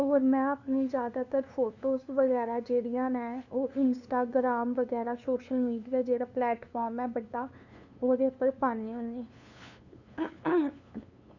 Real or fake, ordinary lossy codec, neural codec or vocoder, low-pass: fake; none; codec, 16 kHz, 2 kbps, FunCodec, trained on LibriTTS, 25 frames a second; 7.2 kHz